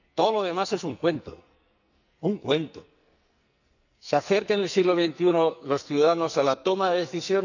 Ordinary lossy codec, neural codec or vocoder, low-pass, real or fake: none; codec, 44.1 kHz, 2.6 kbps, SNAC; 7.2 kHz; fake